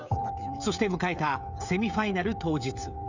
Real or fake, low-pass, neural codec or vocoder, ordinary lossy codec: fake; 7.2 kHz; codec, 16 kHz, 16 kbps, FreqCodec, smaller model; none